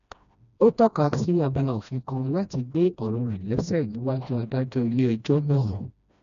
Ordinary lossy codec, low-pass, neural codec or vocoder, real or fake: none; 7.2 kHz; codec, 16 kHz, 1 kbps, FreqCodec, smaller model; fake